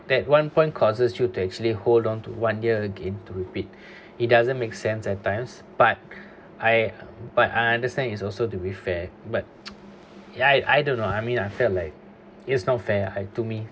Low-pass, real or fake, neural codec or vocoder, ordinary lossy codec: none; real; none; none